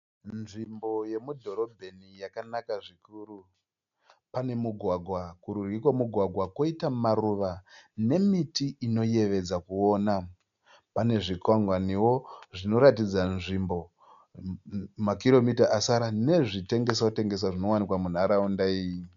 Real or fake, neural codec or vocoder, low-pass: real; none; 7.2 kHz